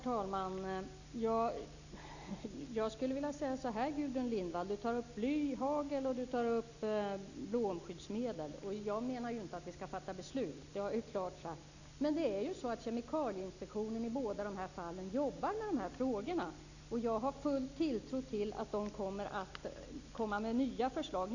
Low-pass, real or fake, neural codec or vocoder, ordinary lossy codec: 7.2 kHz; real; none; none